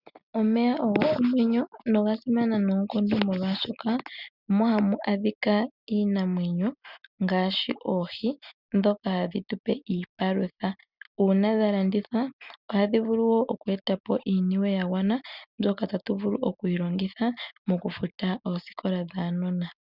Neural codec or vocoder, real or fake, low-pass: none; real; 5.4 kHz